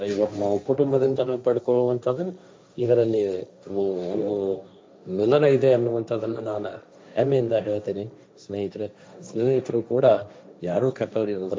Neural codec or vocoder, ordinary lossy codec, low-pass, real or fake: codec, 16 kHz, 1.1 kbps, Voila-Tokenizer; none; none; fake